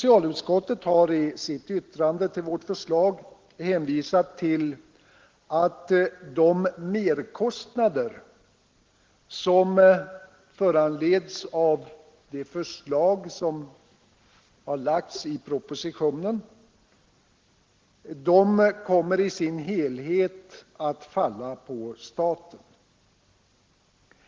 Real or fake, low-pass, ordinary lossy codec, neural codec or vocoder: real; 7.2 kHz; Opus, 16 kbps; none